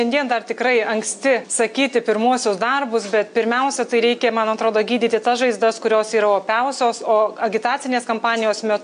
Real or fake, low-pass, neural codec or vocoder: real; 9.9 kHz; none